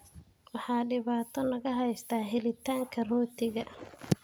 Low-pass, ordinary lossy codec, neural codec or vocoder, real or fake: none; none; none; real